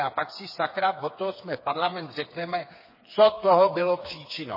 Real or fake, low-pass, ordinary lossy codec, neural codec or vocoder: fake; 5.4 kHz; MP3, 24 kbps; codec, 16 kHz, 4 kbps, FreqCodec, smaller model